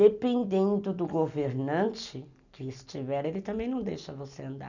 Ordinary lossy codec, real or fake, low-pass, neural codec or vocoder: none; real; 7.2 kHz; none